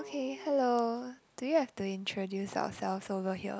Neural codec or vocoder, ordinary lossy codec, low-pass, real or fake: none; none; none; real